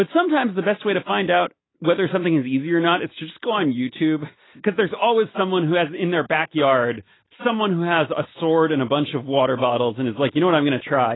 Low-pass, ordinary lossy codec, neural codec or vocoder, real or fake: 7.2 kHz; AAC, 16 kbps; none; real